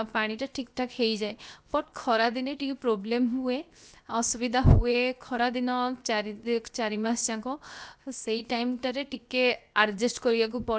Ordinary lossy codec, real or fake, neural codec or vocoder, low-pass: none; fake; codec, 16 kHz, 0.7 kbps, FocalCodec; none